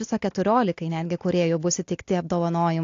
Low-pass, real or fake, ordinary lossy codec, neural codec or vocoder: 7.2 kHz; real; AAC, 48 kbps; none